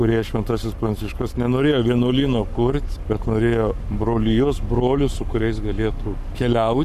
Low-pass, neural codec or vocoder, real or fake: 14.4 kHz; codec, 44.1 kHz, 7.8 kbps, Pupu-Codec; fake